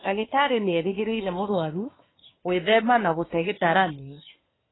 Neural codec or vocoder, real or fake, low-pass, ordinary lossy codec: codec, 24 kHz, 1 kbps, SNAC; fake; 7.2 kHz; AAC, 16 kbps